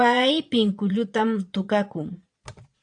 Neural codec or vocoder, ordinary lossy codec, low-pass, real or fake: vocoder, 22.05 kHz, 80 mel bands, Vocos; AAC, 64 kbps; 9.9 kHz; fake